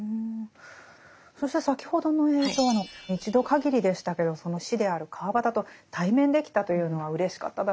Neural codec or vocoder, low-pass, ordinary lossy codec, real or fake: none; none; none; real